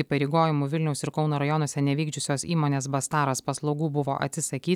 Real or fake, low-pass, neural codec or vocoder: real; 19.8 kHz; none